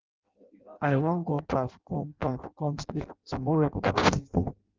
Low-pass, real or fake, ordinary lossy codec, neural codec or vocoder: 7.2 kHz; fake; Opus, 24 kbps; codec, 16 kHz in and 24 kHz out, 0.6 kbps, FireRedTTS-2 codec